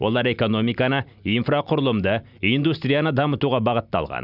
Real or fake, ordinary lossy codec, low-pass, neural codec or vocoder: real; none; 5.4 kHz; none